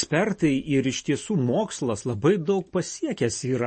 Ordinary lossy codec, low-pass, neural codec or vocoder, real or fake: MP3, 32 kbps; 10.8 kHz; none; real